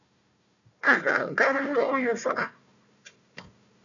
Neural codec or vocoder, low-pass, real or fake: codec, 16 kHz, 1 kbps, FunCodec, trained on Chinese and English, 50 frames a second; 7.2 kHz; fake